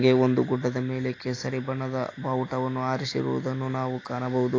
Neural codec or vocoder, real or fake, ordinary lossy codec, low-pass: none; real; AAC, 32 kbps; 7.2 kHz